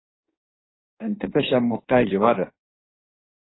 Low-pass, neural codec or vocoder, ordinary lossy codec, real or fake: 7.2 kHz; codec, 16 kHz in and 24 kHz out, 1.1 kbps, FireRedTTS-2 codec; AAC, 16 kbps; fake